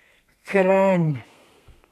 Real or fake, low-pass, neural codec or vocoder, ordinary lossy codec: fake; 14.4 kHz; codec, 32 kHz, 1.9 kbps, SNAC; none